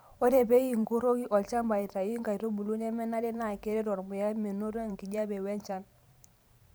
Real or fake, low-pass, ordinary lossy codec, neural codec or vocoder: fake; none; none; vocoder, 44.1 kHz, 128 mel bands every 512 samples, BigVGAN v2